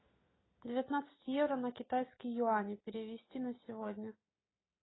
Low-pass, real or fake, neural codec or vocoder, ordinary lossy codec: 7.2 kHz; real; none; AAC, 16 kbps